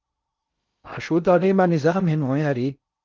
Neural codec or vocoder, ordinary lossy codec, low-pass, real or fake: codec, 16 kHz in and 24 kHz out, 0.6 kbps, FocalCodec, streaming, 2048 codes; Opus, 32 kbps; 7.2 kHz; fake